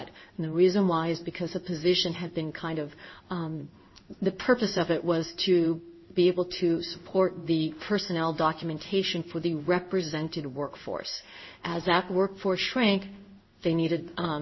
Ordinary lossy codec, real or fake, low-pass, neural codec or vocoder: MP3, 24 kbps; fake; 7.2 kHz; codec, 16 kHz in and 24 kHz out, 1 kbps, XY-Tokenizer